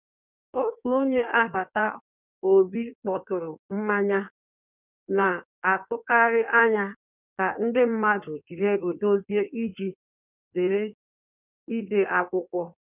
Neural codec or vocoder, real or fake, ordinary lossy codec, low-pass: codec, 16 kHz in and 24 kHz out, 1.1 kbps, FireRedTTS-2 codec; fake; none; 3.6 kHz